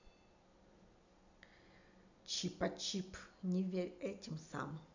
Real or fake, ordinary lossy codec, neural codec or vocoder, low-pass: real; none; none; 7.2 kHz